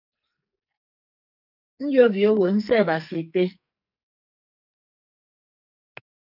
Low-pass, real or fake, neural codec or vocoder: 5.4 kHz; fake; codec, 44.1 kHz, 2.6 kbps, SNAC